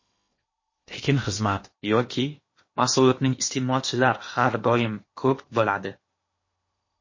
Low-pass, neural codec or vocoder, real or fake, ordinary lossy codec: 7.2 kHz; codec, 16 kHz in and 24 kHz out, 0.8 kbps, FocalCodec, streaming, 65536 codes; fake; MP3, 32 kbps